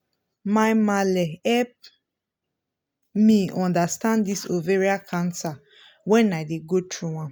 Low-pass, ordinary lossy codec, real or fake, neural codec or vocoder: none; none; real; none